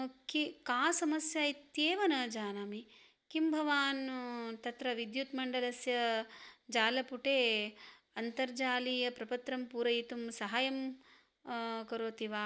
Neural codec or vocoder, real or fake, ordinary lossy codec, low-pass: none; real; none; none